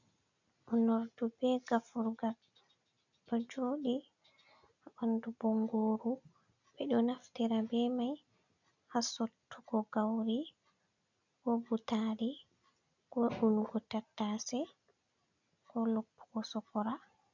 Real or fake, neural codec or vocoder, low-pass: real; none; 7.2 kHz